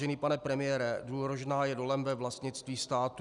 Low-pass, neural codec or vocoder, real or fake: 10.8 kHz; none; real